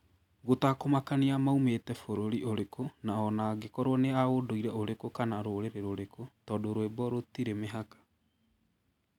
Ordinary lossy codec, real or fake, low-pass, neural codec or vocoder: none; real; 19.8 kHz; none